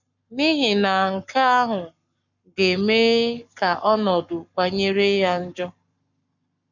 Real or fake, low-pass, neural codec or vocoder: fake; 7.2 kHz; codec, 44.1 kHz, 7.8 kbps, Pupu-Codec